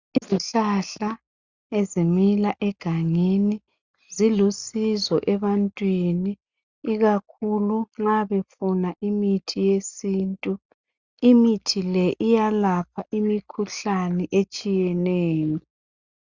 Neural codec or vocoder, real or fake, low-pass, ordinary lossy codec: none; real; 7.2 kHz; Opus, 64 kbps